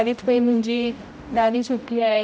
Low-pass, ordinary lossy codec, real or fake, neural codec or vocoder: none; none; fake; codec, 16 kHz, 0.5 kbps, X-Codec, HuBERT features, trained on general audio